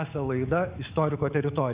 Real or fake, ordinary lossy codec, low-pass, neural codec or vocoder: fake; Opus, 64 kbps; 3.6 kHz; codec, 44.1 kHz, 7.8 kbps, DAC